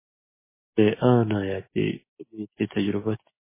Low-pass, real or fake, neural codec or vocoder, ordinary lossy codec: 3.6 kHz; real; none; MP3, 16 kbps